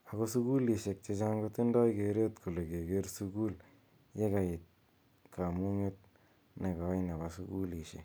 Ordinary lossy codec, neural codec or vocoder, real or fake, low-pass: none; none; real; none